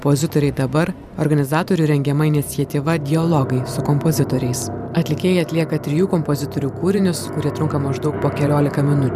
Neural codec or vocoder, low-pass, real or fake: vocoder, 44.1 kHz, 128 mel bands every 512 samples, BigVGAN v2; 14.4 kHz; fake